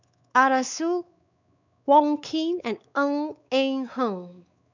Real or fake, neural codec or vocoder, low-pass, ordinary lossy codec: fake; codec, 16 kHz, 4 kbps, X-Codec, WavLM features, trained on Multilingual LibriSpeech; 7.2 kHz; none